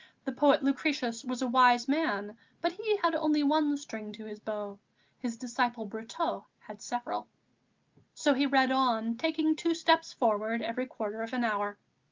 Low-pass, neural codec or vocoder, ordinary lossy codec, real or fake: 7.2 kHz; none; Opus, 32 kbps; real